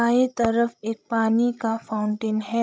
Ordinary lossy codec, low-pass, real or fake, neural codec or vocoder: none; none; fake; codec, 16 kHz, 16 kbps, FreqCodec, larger model